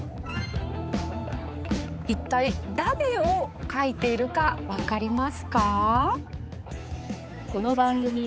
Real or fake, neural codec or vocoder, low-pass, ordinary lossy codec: fake; codec, 16 kHz, 4 kbps, X-Codec, HuBERT features, trained on balanced general audio; none; none